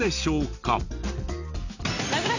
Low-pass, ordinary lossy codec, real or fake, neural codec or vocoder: 7.2 kHz; none; real; none